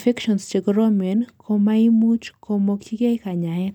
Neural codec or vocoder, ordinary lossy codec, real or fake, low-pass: none; none; real; 19.8 kHz